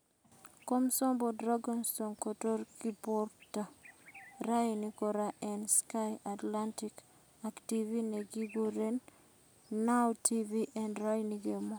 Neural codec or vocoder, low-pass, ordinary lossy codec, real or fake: none; none; none; real